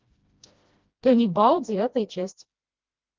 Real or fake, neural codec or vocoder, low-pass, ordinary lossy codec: fake; codec, 16 kHz, 1 kbps, FreqCodec, smaller model; 7.2 kHz; Opus, 24 kbps